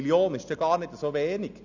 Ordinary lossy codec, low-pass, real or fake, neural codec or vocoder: none; 7.2 kHz; real; none